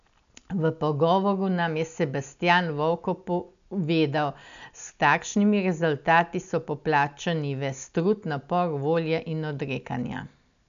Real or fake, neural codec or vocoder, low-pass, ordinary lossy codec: real; none; 7.2 kHz; none